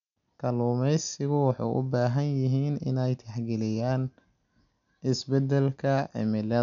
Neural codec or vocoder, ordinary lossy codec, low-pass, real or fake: none; none; 7.2 kHz; real